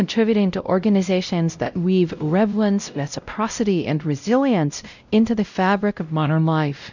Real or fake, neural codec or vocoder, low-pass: fake; codec, 16 kHz, 0.5 kbps, X-Codec, WavLM features, trained on Multilingual LibriSpeech; 7.2 kHz